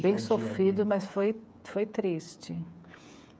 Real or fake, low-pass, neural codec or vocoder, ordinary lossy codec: fake; none; codec, 16 kHz, 16 kbps, FreqCodec, smaller model; none